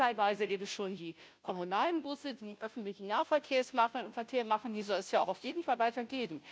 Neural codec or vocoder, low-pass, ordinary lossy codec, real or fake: codec, 16 kHz, 0.5 kbps, FunCodec, trained on Chinese and English, 25 frames a second; none; none; fake